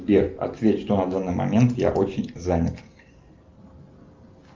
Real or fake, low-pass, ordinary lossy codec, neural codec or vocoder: real; 7.2 kHz; Opus, 24 kbps; none